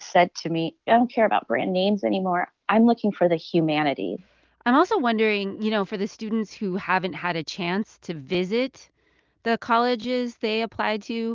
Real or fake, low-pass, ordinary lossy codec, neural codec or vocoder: real; 7.2 kHz; Opus, 32 kbps; none